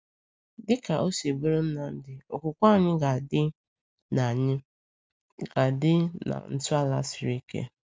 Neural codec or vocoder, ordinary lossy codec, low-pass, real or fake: none; none; none; real